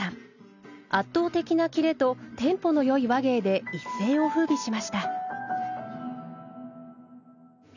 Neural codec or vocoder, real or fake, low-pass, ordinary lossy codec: none; real; 7.2 kHz; none